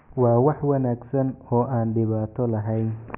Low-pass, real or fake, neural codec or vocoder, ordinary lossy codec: 3.6 kHz; real; none; none